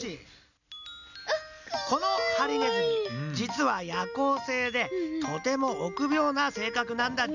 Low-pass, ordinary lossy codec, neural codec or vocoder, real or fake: 7.2 kHz; none; none; real